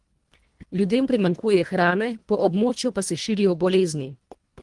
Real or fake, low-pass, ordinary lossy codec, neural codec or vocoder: fake; 10.8 kHz; Opus, 24 kbps; codec, 24 kHz, 1.5 kbps, HILCodec